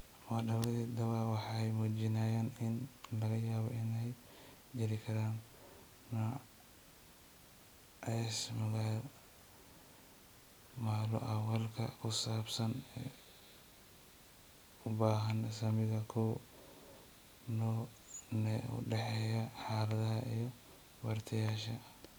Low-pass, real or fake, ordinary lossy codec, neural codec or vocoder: none; real; none; none